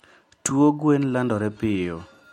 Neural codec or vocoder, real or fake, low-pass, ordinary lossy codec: none; real; 19.8 kHz; MP3, 64 kbps